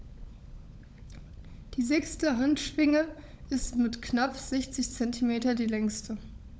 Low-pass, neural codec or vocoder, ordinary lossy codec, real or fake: none; codec, 16 kHz, 16 kbps, FunCodec, trained on LibriTTS, 50 frames a second; none; fake